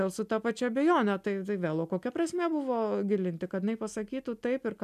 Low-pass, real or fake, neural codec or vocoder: 14.4 kHz; real; none